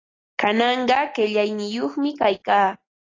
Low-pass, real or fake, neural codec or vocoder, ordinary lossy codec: 7.2 kHz; real; none; AAC, 32 kbps